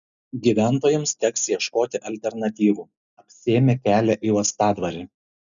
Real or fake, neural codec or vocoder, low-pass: real; none; 7.2 kHz